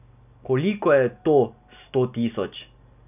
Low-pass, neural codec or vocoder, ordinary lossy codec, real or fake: 3.6 kHz; none; none; real